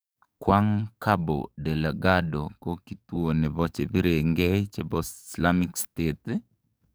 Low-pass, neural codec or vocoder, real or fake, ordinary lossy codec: none; codec, 44.1 kHz, 7.8 kbps, DAC; fake; none